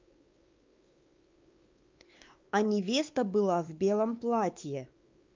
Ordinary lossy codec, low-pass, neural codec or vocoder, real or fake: Opus, 24 kbps; 7.2 kHz; codec, 16 kHz, 4 kbps, X-Codec, WavLM features, trained on Multilingual LibriSpeech; fake